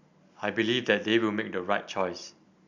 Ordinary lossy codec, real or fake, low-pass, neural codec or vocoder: none; real; 7.2 kHz; none